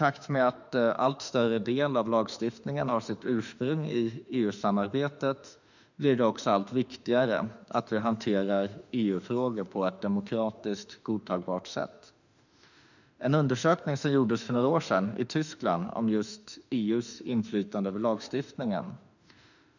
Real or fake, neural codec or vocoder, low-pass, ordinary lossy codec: fake; autoencoder, 48 kHz, 32 numbers a frame, DAC-VAE, trained on Japanese speech; 7.2 kHz; none